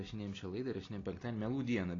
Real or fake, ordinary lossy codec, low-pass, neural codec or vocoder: real; AAC, 32 kbps; 7.2 kHz; none